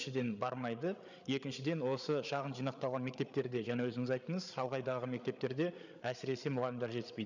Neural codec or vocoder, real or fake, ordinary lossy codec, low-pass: codec, 16 kHz, 8 kbps, FreqCodec, larger model; fake; none; 7.2 kHz